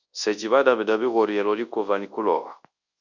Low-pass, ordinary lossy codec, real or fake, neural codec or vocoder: 7.2 kHz; Opus, 64 kbps; fake; codec, 24 kHz, 0.9 kbps, WavTokenizer, large speech release